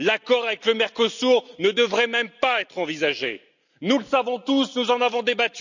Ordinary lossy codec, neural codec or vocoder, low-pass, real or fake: none; none; 7.2 kHz; real